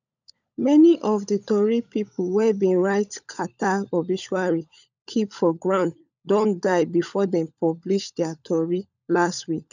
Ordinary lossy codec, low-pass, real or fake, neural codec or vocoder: none; 7.2 kHz; fake; codec, 16 kHz, 16 kbps, FunCodec, trained on LibriTTS, 50 frames a second